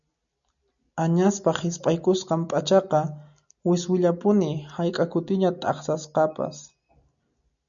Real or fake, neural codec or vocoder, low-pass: real; none; 7.2 kHz